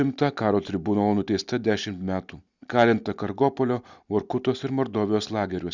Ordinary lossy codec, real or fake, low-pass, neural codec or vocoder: Opus, 64 kbps; real; 7.2 kHz; none